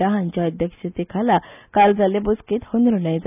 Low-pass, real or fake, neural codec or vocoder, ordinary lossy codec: 3.6 kHz; real; none; none